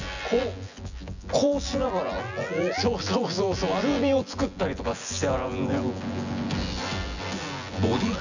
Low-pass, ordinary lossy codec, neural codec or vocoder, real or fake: 7.2 kHz; none; vocoder, 24 kHz, 100 mel bands, Vocos; fake